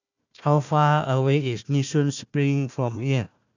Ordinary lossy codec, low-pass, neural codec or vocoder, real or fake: none; 7.2 kHz; codec, 16 kHz, 1 kbps, FunCodec, trained on Chinese and English, 50 frames a second; fake